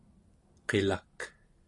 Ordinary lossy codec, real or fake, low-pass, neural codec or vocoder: AAC, 48 kbps; real; 10.8 kHz; none